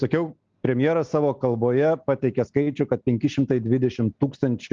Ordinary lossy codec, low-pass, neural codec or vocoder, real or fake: Opus, 24 kbps; 7.2 kHz; none; real